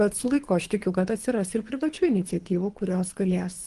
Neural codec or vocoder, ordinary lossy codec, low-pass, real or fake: codec, 24 kHz, 3 kbps, HILCodec; Opus, 24 kbps; 10.8 kHz; fake